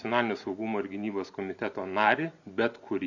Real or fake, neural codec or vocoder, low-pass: real; none; 7.2 kHz